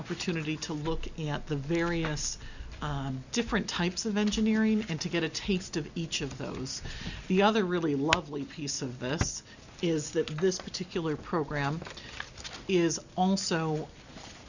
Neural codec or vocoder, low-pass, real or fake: none; 7.2 kHz; real